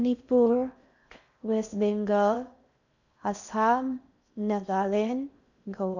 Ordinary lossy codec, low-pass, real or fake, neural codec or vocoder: none; 7.2 kHz; fake; codec, 16 kHz in and 24 kHz out, 0.6 kbps, FocalCodec, streaming, 4096 codes